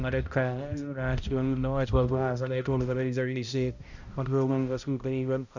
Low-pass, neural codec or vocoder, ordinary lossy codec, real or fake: 7.2 kHz; codec, 16 kHz, 0.5 kbps, X-Codec, HuBERT features, trained on balanced general audio; none; fake